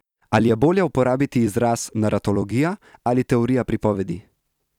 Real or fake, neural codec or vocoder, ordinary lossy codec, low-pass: fake; vocoder, 44.1 kHz, 128 mel bands every 256 samples, BigVGAN v2; none; 19.8 kHz